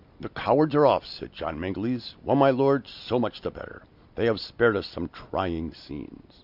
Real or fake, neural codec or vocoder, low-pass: real; none; 5.4 kHz